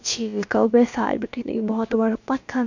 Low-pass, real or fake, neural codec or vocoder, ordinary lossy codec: 7.2 kHz; fake; codec, 16 kHz, about 1 kbps, DyCAST, with the encoder's durations; none